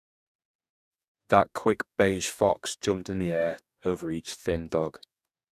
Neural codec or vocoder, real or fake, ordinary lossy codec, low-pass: codec, 44.1 kHz, 2.6 kbps, DAC; fake; none; 14.4 kHz